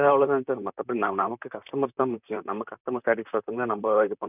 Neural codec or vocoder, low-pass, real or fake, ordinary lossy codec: vocoder, 44.1 kHz, 128 mel bands, Pupu-Vocoder; 3.6 kHz; fake; none